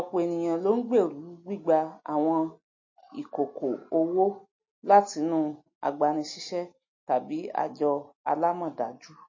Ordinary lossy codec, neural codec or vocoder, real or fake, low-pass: MP3, 32 kbps; none; real; 7.2 kHz